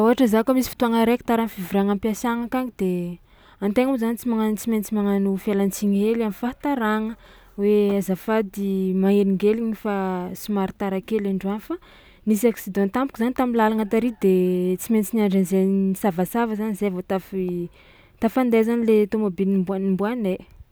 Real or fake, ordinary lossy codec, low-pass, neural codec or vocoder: real; none; none; none